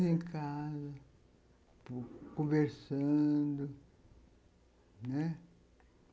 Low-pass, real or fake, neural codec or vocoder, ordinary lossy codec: none; real; none; none